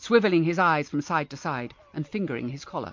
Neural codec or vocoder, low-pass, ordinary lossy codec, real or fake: none; 7.2 kHz; MP3, 48 kbps; real